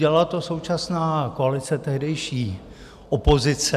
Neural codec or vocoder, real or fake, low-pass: none; real; 14.4 kHz